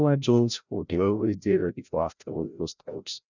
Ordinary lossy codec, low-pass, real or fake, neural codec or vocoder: none; 7.2 kHz; fake; codec, 16 kHz, 0.5 kbps, FreqCodec, larger model